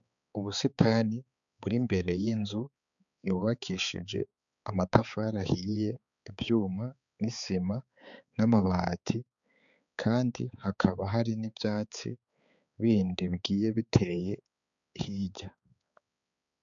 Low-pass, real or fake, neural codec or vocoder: 7.2 kHz; fake; codec, 16 kHz, 4 kbps, X-Codec, HuBERT features, trained on balanced general audio